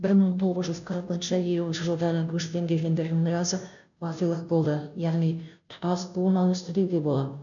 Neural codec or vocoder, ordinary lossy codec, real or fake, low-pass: codec, 16 kHz, 0.5 kbps, FunCodec, trained on Chinese and English, 25 frames a second; none; fake; 7.2 kHz